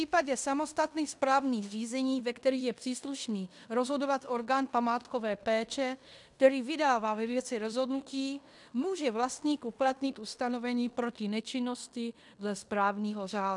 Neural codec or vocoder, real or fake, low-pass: codec, 16 kHz in and 24 kHz out, 0.9 kbps, LongCat-Audio-Codec, fine tuned four codebook decoder; fake; 10.8 kHz